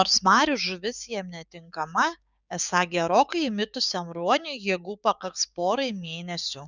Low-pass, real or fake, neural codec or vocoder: 7.2 kHz; fake; codec, 16 kHz, 6 kbps, DAC